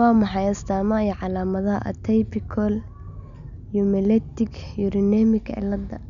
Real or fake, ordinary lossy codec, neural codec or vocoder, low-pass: real; none; none; 7.2 kHz